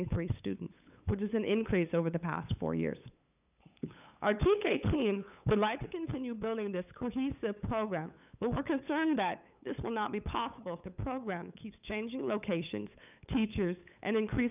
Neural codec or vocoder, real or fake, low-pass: codec, 16 kHz, 8 kbps, FunCodec, trained on LibriTTS, 25 frames a second; fake; 3.6 kHz